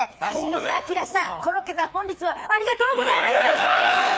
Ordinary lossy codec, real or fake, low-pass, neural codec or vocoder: none; fake; none; codec, 16 kHz, 2 kbps, FreqCodec, larger model